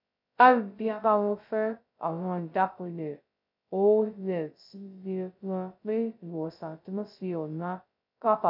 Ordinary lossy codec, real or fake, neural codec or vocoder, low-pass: MP3, 48 kbps; fake; codec, 16 kHz, 0.2 kbps, FocalCodec; 5.4 kHz